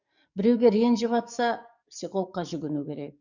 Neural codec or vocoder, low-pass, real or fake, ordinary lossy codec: codec, 44.1 kHz, 7.8 kbps, DAC; 7.2 kHz; fake; none